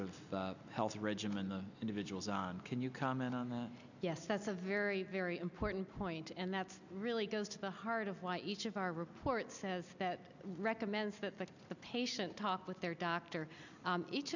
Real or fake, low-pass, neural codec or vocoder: real; 7.2 kHz; none